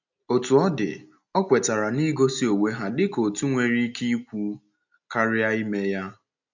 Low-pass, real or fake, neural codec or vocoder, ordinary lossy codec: 7.2 kHz; real; none; none